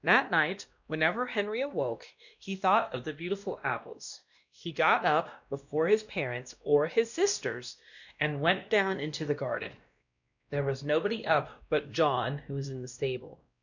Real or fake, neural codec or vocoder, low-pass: fake; codec, 16 kHz, 1 kbps, X-Codec, WavLM features, trained on Multilingual LibriSpeech; 7.2 kHz